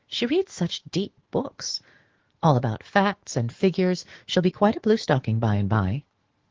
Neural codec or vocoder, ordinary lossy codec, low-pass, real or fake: autoencoder, 48 kHz, 128 numbers a frame, DAC-VAE, trained on Japanese speech; Opus, 16 kbps; 7.2 kHz; fake